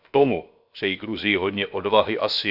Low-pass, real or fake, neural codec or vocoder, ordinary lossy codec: 5.4 kHz; fake; codec, 16 kHz, about 1 kbps, DyCAST, with the encoder's durations; none